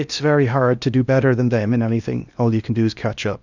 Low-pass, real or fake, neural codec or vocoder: 7.2 kHz; fake; codec, 16 kHz in and 24 kHz out, 0.6 kbps, FocalCodec, streaming, 2048 codes